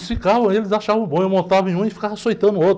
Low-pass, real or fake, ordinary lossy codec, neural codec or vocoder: none; real; none; none